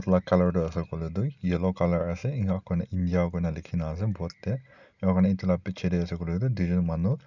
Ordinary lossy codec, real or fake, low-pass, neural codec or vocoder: none; real; 7.2 kHz; none